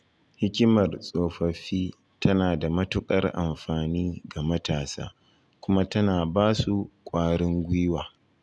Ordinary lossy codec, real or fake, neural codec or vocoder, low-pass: none; real; none; none